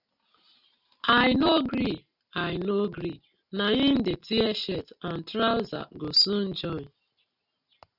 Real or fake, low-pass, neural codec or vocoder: real; 5.4 kHz; none